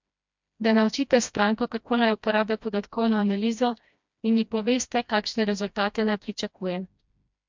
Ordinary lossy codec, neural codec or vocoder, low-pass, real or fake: MP3, 64 kbps; codec, 16 kHz, 1 kbps, FreqCodec, smaller model; 7.2 kHz; fake